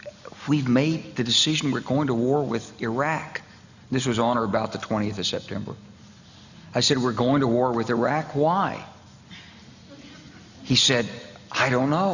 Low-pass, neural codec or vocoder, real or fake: 7.2 kHz; none; real